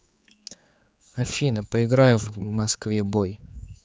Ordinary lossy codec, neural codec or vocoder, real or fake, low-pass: none; codec, 16 kHz, 4 kbps, X-Codec, HuBERT features, trained on general audio; fake; none